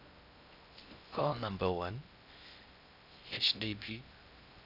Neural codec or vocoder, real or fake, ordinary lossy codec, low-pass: codec, 16 kHz in and 24 kHz out, 0.6 kbps, FocalCodec, streaming, 2048 codes; fake; none; 5.4 kHz